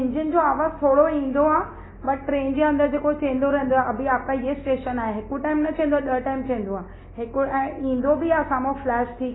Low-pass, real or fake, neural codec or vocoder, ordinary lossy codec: 7.2 kHz; real; none; AAC, 16 kbps